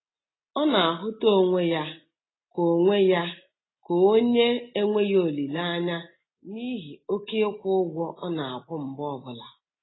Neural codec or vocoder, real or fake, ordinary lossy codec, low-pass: none; real; AAC, 16 kbps; 7.2 kHz